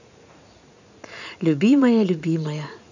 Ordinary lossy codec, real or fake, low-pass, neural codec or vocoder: none; fake; 7.2 kHz; vocoder, 44.1 kHz, 80 mel bands, Vocos